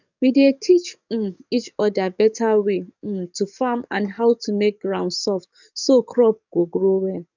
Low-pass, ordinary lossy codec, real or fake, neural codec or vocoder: 7.2 kHz; none; fake; codec, 44.1 kHz, 7.8 kbps, DAC